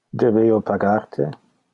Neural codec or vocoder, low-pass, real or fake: none; 10.8 kHz; real